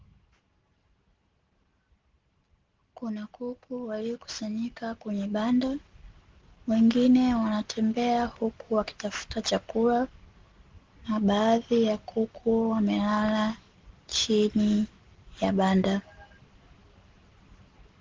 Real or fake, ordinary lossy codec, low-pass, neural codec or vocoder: real; Opus, 16 kbps; 7.2 kHz; none